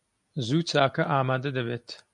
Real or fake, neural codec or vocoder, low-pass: real; none; 10.8 kHz